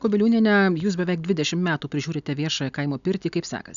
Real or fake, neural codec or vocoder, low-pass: real; none; 7.2 kHz